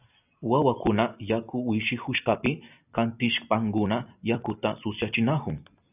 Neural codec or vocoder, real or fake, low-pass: none; real; 3.6 kHz